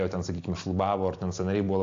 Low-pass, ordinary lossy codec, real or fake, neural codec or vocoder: 7.2 kHz; AAC, 48 kbps; real; none